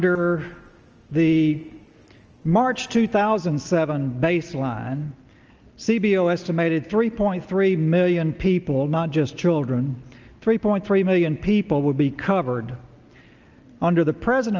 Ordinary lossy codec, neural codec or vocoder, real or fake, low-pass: Opus, 24 kbps; none; real; 7.2 kHz